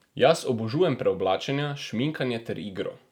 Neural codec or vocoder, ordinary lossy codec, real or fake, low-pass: none; none; real; 19.8 kHz